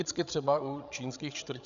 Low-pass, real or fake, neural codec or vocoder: 7.2 kHz; fake; codec, 16 kHz, 16 kbps, FreqCodec, larger model